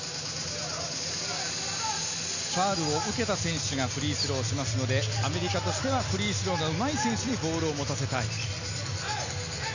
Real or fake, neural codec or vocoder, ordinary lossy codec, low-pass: real; none; none; 7.2 kHz